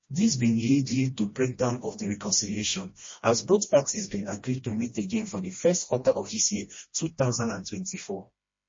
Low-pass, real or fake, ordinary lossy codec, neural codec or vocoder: 7.2 kHz; fake; MP3, 32 kbps; codec, 16 kHz, 1 kbps, FreqCodec, smaller model